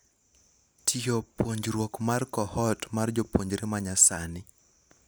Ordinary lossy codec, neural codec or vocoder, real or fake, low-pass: none; none; real; none